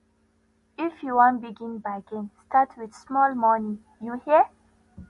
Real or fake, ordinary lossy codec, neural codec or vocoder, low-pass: real; MP3, 48 kbps; none; 14.4 kHz